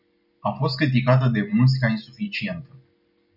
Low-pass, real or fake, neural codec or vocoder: 5.4 kHz; real; none